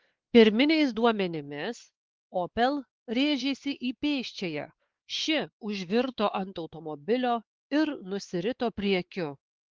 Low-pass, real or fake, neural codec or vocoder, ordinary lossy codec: 7.2 kHz; fake; codec, 16 kHz, 4 kbps, X-Codec, WavLM features, trained on Multilingual LibriSpeech; Opus, 16 kbps